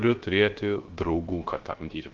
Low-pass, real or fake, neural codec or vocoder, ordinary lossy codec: 7.2 kHz; fake; codec, 16 kHz, 0.3 kbps, FocalCodec; Opus, 16 kbps